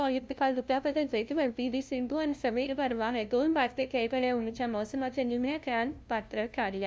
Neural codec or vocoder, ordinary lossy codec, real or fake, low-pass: codec, 16 kHz, 0.5 kbps, FunCodec, trained on LibriTTS, 25 frames a second; none; fake; none